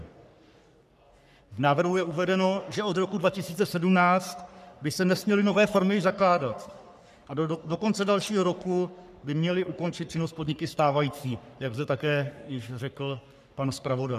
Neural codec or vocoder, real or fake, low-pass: codec, 44.1 kHz, 3.4 kbps, Pupu-Codec; fake; 14.4 kHz